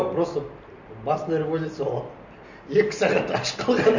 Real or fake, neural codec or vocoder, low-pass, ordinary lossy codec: real; none; 7.2 kHz; none